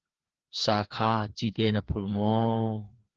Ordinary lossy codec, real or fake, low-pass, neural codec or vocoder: Opus, 16 kbps; fake; 7.2 kHz; codec, 16 kHz, 2 kbps, FreqCodec, larger model